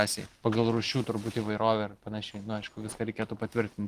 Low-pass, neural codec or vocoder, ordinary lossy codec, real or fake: 14.4 kHz; none; Opus, 24 kbps; real